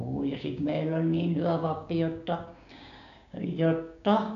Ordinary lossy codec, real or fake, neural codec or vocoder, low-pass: none; fake; codec, 16 kHz, 6 kbps, DAC; 7.2 kHz